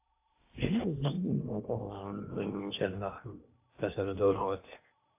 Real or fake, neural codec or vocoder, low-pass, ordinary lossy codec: fake; codec, 16 kHz in and 24 kHz out, 0.8 kbps, FocalCodec, streaming, 65536 codes; 3.6 kHz; AAC, 32 kbps